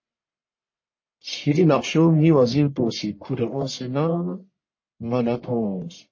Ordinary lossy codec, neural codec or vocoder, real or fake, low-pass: MP3, 32 kbps; codec, 44.1 kHz, 1.7 kbps, Pupu-Codec; fake; 7.2 kHz